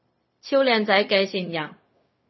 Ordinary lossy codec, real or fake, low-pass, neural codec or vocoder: MP3, 24 kbps; fake; 7.2 kHz; codec, 16 kHz, 0.4 kbps, LongCat-Audio-Codec